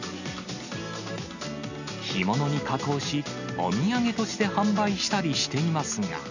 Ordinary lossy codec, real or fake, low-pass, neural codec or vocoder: none; real; 7.2 kHz; none